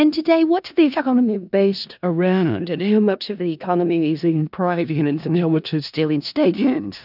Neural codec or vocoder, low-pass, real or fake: codec, 16 kHz in and 24 kHz out, 0.4 kbps, LongCat-Audio-Codec, four codebook decoder; 5.4 kHz; fake